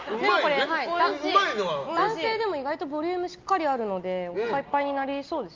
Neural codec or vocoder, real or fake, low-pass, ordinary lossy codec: none; real; 7.2 kHz; Opus, 32 kbps